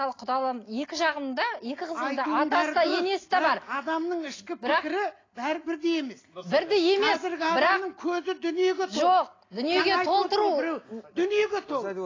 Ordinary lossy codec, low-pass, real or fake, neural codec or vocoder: AAC, 32 kbps; 7.2 kHz; real; none